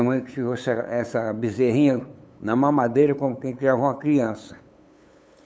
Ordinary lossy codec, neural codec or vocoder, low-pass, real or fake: none; codec, 16 kHz, 8 kbps, FunCodec, trained on LibriTTS, 25 frames a second; none; fake